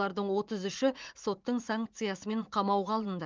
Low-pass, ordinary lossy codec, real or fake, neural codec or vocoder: 7.2 kHz; Opus, 32 kbps; fake; vocoder, 44.1 kHz, 80 mel bands, Vocos